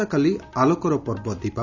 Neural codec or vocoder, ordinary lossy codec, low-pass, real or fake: none; none; 7.2 kHz; real